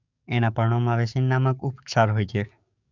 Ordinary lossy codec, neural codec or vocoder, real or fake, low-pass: none; codec, 44.1 kHz, 7.8 kbps, DAC; fake; 7.2 kHz